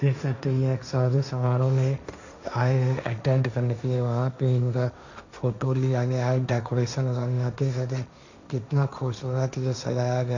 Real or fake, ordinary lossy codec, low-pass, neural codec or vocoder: fake; none; 7.2 kHz; codec, 16 kHz, 1.1 kbps, Voila-Tokenizer